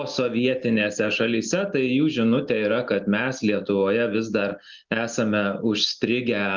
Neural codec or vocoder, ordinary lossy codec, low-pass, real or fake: none; Opus, 32 kbps; 7.2 kHz; real